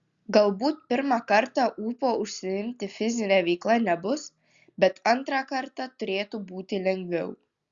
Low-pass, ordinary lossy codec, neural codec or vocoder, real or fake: 7.2 kHz; Opus, 64 kbps; none; real